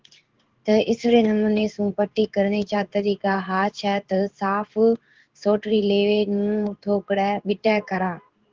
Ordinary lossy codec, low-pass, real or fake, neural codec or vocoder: Opus, 16 kbps; 7.2 kHz; fake; codec, 16 kHz in and 24 kHz out, 1 kbps, XY-Tokenizer